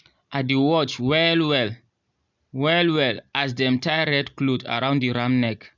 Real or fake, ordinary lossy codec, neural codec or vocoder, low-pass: real; MP3, 64 kbps; none; 7.2 kHz